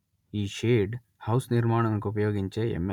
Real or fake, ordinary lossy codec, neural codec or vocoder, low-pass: real; none; none; 19.8 kHz